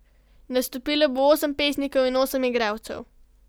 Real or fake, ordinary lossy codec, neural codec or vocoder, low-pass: real; none; none; none